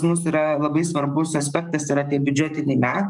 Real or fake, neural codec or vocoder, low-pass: fake; codec, 44.1 kHz, 7.8 kbps, DAC; 10.8 kHz